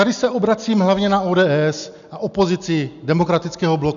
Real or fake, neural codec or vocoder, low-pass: real; none; 7.2 kHz